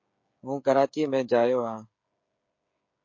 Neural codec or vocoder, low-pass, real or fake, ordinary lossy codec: codec, 16 kHz, 16 kbps, FreqCodec, smaller model; 7.2 kHz; fake; MP3, 48 kbps